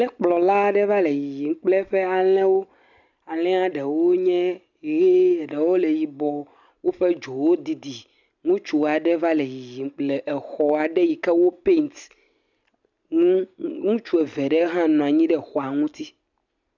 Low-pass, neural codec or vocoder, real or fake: 7.2 kHz; none; real